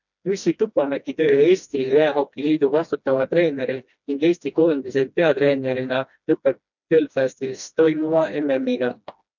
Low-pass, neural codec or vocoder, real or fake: 7.2 kHz; codec, 16 kHz, 1 kbps, FreqCodec, smaller model; fake